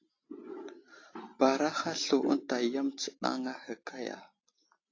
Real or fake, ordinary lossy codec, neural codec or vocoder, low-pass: real; AAC, 32 kbps; none; 7.2 kHz